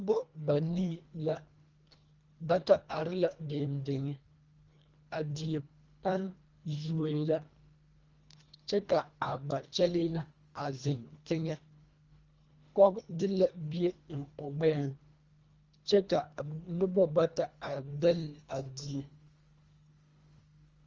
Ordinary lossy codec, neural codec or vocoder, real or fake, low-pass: Opus, 32 kbps; codec, 24 kHz, 1.5 kbps, HILCodec; fake; 7.2 kHz